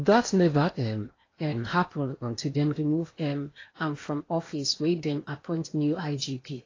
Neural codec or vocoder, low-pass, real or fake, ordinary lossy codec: codec, 16 kHz in and 24 kHz out, 0.8 kbps, FocalCodec, streaming, 65536 codes; 7.2 kHz; fake; AAC, 32 kbps